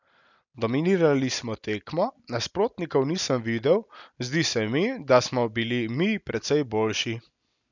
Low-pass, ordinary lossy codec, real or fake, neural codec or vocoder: 7.2 kHz; none; real; none